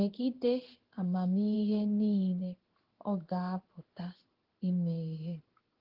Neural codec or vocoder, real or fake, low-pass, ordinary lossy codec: codec, 16 kHz in and 24 kHz out, 1 kbps, XY-Tokenizer; fake; 5.4 kHz; Opus, 16 kbps